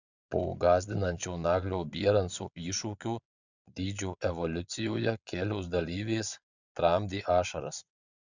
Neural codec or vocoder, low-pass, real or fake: vocoder, 22.05 kHz, 80 mel bands, Vocos; 7.2 kHz; fake